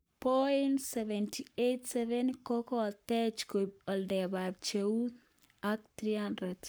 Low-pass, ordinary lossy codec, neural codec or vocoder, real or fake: none; none; codec, 44.1 kHz, 7.8 kbps, Pupu-Codec; fake